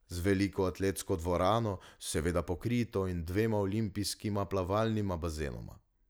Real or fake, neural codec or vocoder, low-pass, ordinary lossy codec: real; none; none; none